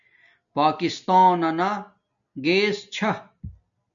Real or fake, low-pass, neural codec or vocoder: real; 7.2 kHz; none